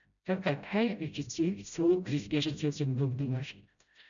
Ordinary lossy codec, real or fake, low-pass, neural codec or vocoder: MP3, 96 kbps; fake; 7.2 kHz; codec, 16 kHz, 0.5 kbps, FreqCodec, smaller model